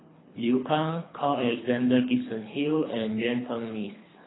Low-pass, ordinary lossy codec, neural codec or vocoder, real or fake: 7.2 kHz; AAC, 16 kbps; codec, 24 kHz, 3 kbps, HILCodec; fake